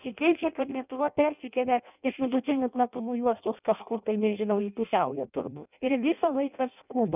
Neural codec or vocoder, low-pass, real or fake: codec, 16 kHz in and 24 kHz out, 0.6 kbps, FireRedTTS-2 codec; 3.6 kHz; fake